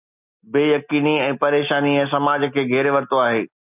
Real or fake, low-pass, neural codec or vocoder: real; 3.6 kHz; none